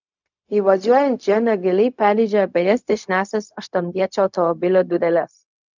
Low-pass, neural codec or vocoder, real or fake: 7.2 kHz; codec, 16 kHz, 0.4 kbps, LongCat-Audio-Codec; fake